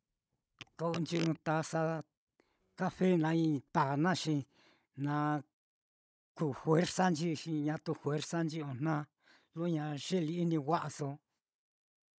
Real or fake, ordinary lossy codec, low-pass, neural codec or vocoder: fake; none; none; codec, 16 kHz, 16 kbps, FunCodec, trained on Chinese and English, 50 frames a second